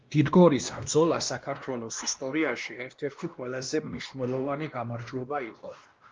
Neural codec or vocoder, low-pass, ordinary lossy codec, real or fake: codec, 16 kHz, 1 kbps, X-Codec, WavLM features, trained on Multilingual LibriSpeech; 7.2 kHz; Opus, 24 kbps; fake